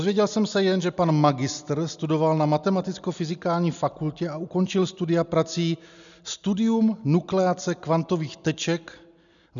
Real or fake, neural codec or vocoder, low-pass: real; none; 7.2 kHz